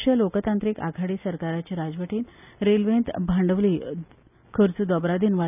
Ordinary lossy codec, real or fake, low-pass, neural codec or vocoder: none; real; 3.6 kHz; none